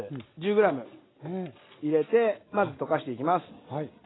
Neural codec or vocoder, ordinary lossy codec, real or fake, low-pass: none; AAC, 16 kbps; real; 7.2 kHz